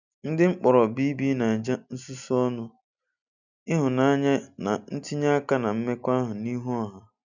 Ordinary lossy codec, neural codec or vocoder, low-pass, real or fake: none; none; 7.2 kHz; real